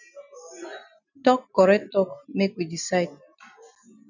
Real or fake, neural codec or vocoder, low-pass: real; none; 7.2 kHz